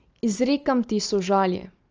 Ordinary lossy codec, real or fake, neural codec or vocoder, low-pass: Opus, 24 kbps; real; none; 7.2 kHz